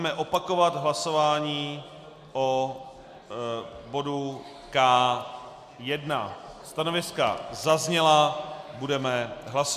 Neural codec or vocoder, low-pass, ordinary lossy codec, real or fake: none; 14.4 kHz; AAC, 96 kbps; real